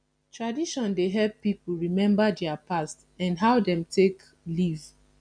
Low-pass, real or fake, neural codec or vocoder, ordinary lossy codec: 9.9 kHz; real; none; none